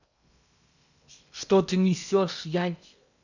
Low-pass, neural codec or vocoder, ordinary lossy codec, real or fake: 7.2 kHz; codec, 16 kHz in and 24 kHz out, 0.8 kbps, FocalCodec, streaming, 65536 codes; none; fake